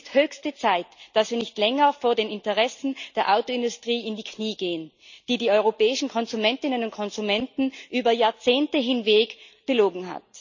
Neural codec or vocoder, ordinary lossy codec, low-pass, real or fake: none; none; 7.2 kHz; real